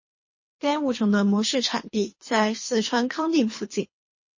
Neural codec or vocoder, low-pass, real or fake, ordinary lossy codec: codec, 24 kHz, 3 kbps, HILCodec; 7.2 kHz; fake; MP3, 32 kbps